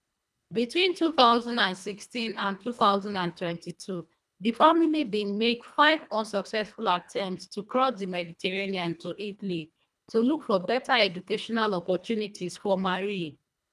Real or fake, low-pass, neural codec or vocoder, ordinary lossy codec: fake; none; codec, 24 kHz, 1.5 kbps, HILCodec; none